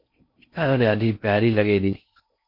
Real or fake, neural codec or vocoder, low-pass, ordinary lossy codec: fake; codec, 16 kHz in and 24 kHz out, 0.6 kbps, FocalCodec, streaming, 4096 codes; 5.4 kHz; MP3, 32 kbps